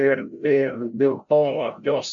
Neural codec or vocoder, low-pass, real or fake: codec, 16 kHz, 0.5 kbps, FreqCodec, larger model; 7.2 kHz; fake